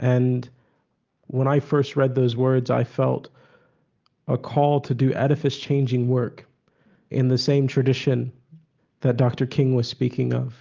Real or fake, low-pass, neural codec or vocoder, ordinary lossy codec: real; 7.2 kHz; none; Opus, 24 kbps